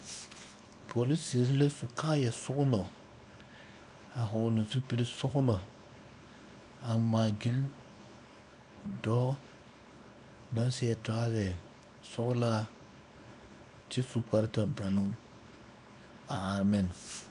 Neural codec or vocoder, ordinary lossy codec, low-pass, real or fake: codec, 24 kHz, 0.9 kbps, WavTokenizer, small release; AAC, 96 kbps; 10.8 kHz; fake